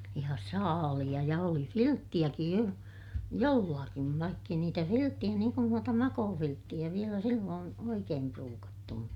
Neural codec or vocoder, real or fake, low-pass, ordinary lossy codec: none; real; 19.8 kHz; none